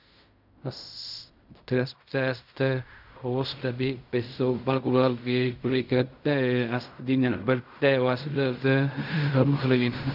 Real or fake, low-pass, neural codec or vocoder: fake; 5.4 kHz; codec, 16 kHz in and 24 kHz out, 0.4 kbps, LongCat-Audio-Codec, fine tuned four codebook decoder